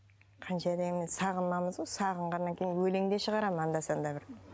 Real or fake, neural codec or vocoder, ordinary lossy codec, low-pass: real; none; none; none